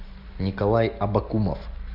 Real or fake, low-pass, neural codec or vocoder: real; 5.4 kHz; none